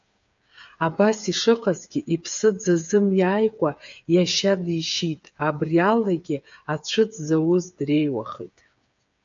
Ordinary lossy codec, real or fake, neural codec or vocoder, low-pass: AAC, 64 kbps; fake; codec, 16 kHz, 8 kbps, FreqCodec, smaller model; 7.2 kHz